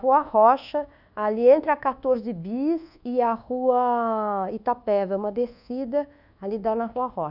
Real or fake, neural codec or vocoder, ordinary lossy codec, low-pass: fake; codec, 24 kHz, 1.2 kbps, DualCodec; none; 5.4 kHz